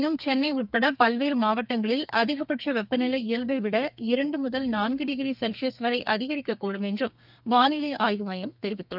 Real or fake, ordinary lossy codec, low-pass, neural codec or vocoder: fake; none; 5.4 kHz; codec, 32 kHz, 1.9 kbps, SNAC